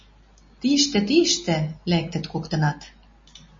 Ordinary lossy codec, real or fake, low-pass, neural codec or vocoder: MP3, 32 kbps; real; 7.2 kHz; none